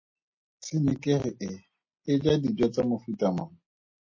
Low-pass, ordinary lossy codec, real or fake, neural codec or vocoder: 7.2 kHz; MP3, 32 kbps; real; none